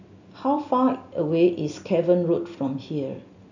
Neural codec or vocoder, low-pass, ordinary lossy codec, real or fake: none; 7.2 kHz; none; real